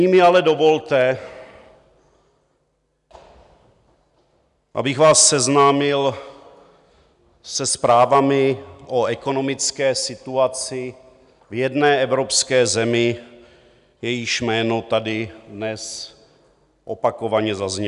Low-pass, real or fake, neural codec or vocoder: 10.8 kHz; real; none